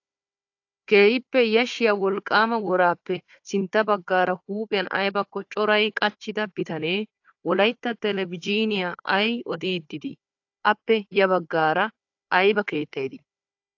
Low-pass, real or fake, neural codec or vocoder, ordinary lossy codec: 7.2 kHz; fake; codec, 16 kHz, 4 kbps, FunCodec, trained on Chinese and English, 50 frames a second; AAC, 48 kbps